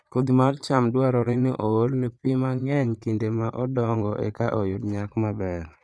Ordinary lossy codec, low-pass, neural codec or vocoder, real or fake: none; none; vocoder, 22.05 kHz, 80 mel bands, Vocos; fake